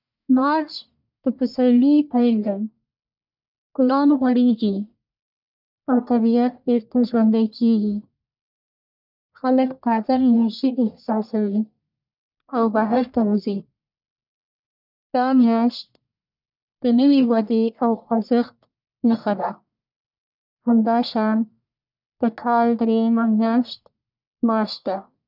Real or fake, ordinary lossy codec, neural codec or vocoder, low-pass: fake; none; codec, 44.1 kHz, 1.7 kbps, Pupu-Codec; 5.4 kHz